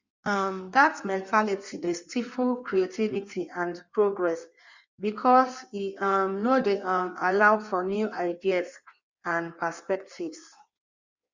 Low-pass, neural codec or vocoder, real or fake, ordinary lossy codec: 7.2 kHz; codec, 16 kHz in and 24 kHz out, 1.1 kbps, FireRedTTS-2 codec; fake; Opus, 64 kbps